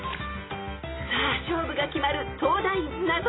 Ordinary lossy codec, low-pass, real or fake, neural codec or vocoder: AAC, 16 kbps; 7.2 kHz; real; none